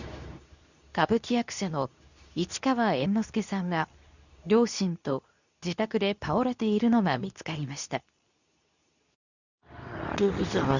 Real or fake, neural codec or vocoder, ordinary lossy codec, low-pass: fake; codec, 24 kHz, 0.9 kbps, WavTokenizer, medium speech release version 2; none; 7.2 kHz